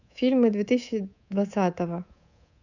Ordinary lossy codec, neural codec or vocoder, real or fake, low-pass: none; codec, 24 kHz, 3.1 kbps, DualCodec; fake; 7.2 kHz